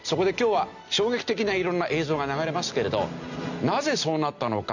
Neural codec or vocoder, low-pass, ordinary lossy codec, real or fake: none; 7.2 kHz; none; real